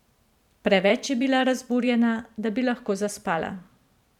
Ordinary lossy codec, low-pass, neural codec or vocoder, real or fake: none; 19.8 kHz; vocoder, 44.1 kHz, 128 mel bands every 512 samples, BigVGAN v2; fake